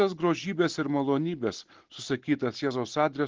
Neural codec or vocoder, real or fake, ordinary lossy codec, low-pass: none; real; Opus, 16 kbps; 7.2 kHz